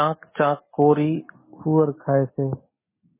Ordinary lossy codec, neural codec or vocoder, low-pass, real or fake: MP3, 16 kbps; none; 3.6 kHz; real